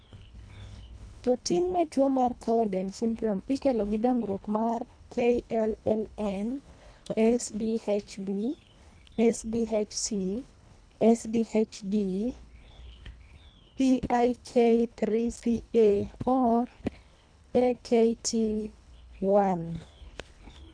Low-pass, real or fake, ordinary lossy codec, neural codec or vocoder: 9.9 kHz; fake; none; codec, 24 kHz, 1.5 kbps, HILCodec